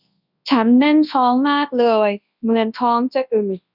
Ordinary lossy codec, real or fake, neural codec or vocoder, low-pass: none; fake; codec, 24 kHz, 0.9 kbps, WavTokenizer, large speech release; 5.4 kHz